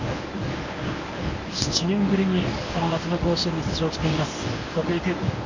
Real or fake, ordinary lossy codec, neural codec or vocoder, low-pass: fake; none; codec, 24 kHz, 0.9 kbps, WavTokenizer, medium speech release version 1; 7.2 kHz